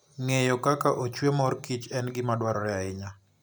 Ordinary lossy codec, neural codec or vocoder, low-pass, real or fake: none; none; none; real